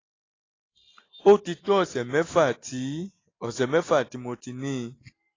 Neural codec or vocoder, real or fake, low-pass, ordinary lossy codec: none; real; 7.2 kHz; AAC, 32 kbps